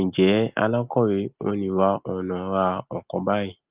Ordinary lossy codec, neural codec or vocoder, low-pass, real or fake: Opus, 32 kbps; none; 3.6 kHz; real